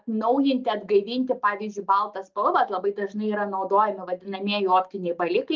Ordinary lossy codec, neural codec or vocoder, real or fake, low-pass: Opus, 24 kbps; none; real; 7.2 kHz